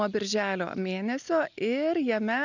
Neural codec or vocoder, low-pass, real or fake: none; 7.2 kHz; real